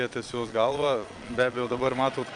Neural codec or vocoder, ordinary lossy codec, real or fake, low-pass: vocoder, 22.05 kHz, 80 mel bands, Vocos; MP3, 64 kbps; fake; 9.9 kHz